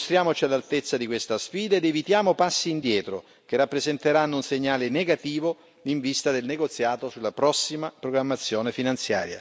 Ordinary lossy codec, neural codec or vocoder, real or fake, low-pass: none; none; real; none